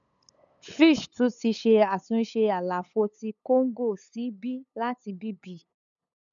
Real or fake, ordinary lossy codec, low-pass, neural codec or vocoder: fake; none; 7.2 kHz; codec, 16 kHz, 8 kbps, FunCodec, trained on LibriTTS, 25 frames a second